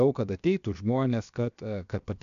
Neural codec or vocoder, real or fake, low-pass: codec, 16 kHz, 0.7 kbps, FocalCodec; fake; 7.2 kHz